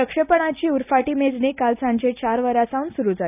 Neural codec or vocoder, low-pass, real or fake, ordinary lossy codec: none; 3.6 kHz; real; none